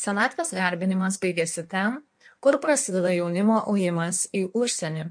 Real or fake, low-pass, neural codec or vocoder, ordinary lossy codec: fake; 9.9 kHz; codec, 16 kHz in and 24 kHz out, 1.1 kbps, FireRedTTS-2 codec; MP3, 64 kbps